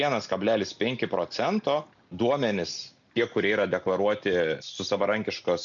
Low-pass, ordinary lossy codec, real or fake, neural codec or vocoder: 7.2 kHz; MP3, 96 kbps; real; none